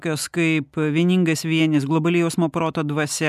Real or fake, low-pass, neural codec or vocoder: real; 14.4 kHz; none